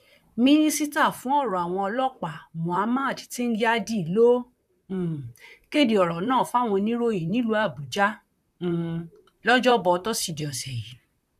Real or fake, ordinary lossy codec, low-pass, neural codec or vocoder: fake; none; 14.4 kHz; vocoder, 44.1 kHz, 128 mel bands, Pupu-Vocoder